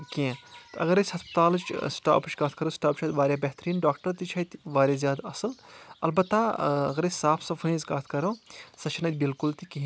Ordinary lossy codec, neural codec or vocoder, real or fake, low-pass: none; none; real; none